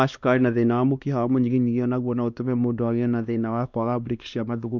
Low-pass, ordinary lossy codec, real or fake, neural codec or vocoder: 7.2 kHz; none; fake; codec, 16 kHz, 2 kbps, X-Codec, WavLM features, trained on Multilingual LibriSpeech